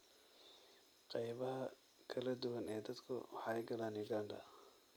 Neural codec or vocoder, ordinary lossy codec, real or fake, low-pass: none; none; real; none